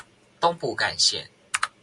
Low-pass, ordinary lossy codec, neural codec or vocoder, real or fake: 10.8 kHz; MP3, 64 kbps; none; real